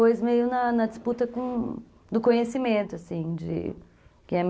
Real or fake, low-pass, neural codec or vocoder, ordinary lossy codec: real; none; none; none